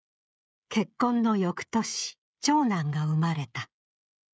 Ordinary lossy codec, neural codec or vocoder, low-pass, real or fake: none; codec, 16 kHz, 16 kbps, FreqCodec, smaller model; none; fake